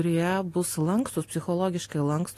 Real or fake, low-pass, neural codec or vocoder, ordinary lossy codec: real; 14.4 kHz; none; AAC, 48 kbps